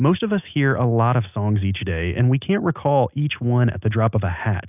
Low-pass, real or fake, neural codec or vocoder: 3.6 kHz; real; none